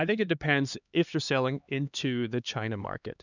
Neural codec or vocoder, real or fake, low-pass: codec, 16 kHz, 2 kbps, X-Codec, HuBERT features, trained on LibriSpeech; fake; 7.2 kHz